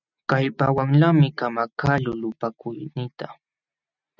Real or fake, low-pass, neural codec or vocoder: real; 7.2 kHz; none